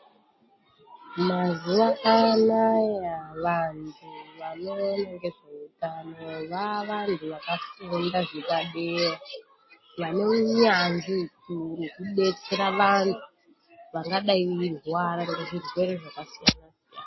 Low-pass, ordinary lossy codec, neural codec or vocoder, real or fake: 7.2 kHz; MP3, 24 kbps; none; real